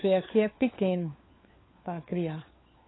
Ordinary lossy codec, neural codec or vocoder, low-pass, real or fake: AAC, 16 kbps; codec, 16 kHz, 4 kbps, FunCodec, trained on LibriTTS, 50 frames a second; 7.2 kHz; fake